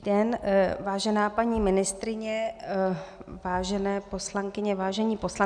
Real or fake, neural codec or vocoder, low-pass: real; none; 9.9 kHz